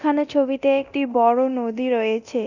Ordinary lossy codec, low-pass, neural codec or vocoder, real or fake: none; 7.2 kHz; codec, 24 kHz, 0.9 kbps, DualCodec; fake